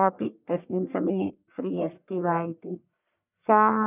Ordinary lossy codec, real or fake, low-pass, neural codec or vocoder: none; fake; 3.6 kHz; codec, 44.1 kHz, 1.7 kbps, Pupu-Codec